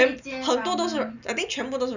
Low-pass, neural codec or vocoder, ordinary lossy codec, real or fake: 7.2 kHz; none; none; real